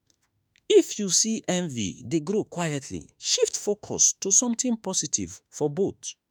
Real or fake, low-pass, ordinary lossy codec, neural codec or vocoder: fake; none; none; autoencoder, 48 kHz, 32 numbers a frame, DAC-VAE, trained on Japanese speech